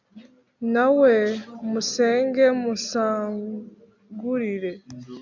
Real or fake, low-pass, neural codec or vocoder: real; 7.2 kHz; none